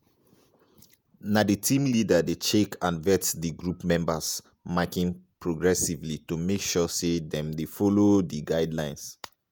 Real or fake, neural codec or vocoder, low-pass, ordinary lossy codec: fake; vocoder, 48 kHz, 128 mel bands, Vocos; none; none